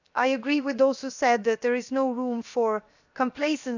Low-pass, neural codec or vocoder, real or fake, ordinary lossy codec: 7.2 kHz; codec, 16 kHz, 0.7 kbps, FocalCodec; fake; none